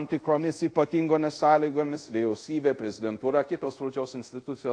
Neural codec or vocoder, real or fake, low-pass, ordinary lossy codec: codec, 24 kHz, 0.5 kbps, DualCodec; fake; 9.9 kHz; AAC, 48 kbps